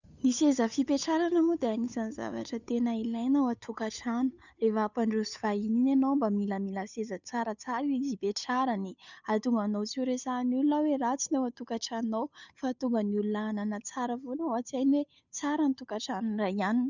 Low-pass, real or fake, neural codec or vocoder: 7.2 kHz; fake; codec, 16 kHz, 8 kbps, FunCodec, trained on Chinese and English, 25 frames a second